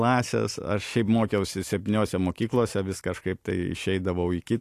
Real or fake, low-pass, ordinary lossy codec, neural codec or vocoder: real; 14.4 kHz; AAC, 64 kbps; none